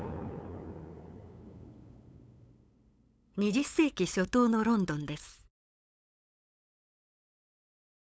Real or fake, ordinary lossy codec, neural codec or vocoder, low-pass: fake; none; codec, 16 kHz, 8 kbps, FunCodec, trained on LibriTTS, 25 frames a second; none